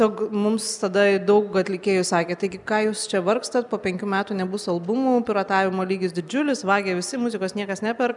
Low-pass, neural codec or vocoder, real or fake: 10.8 kHz; none; real